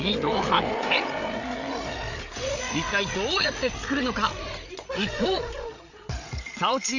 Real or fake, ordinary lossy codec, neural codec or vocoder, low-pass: fake; none; codec, 16 kHz, 8 kbps, FreqCodec, larger model; 7.2 kHz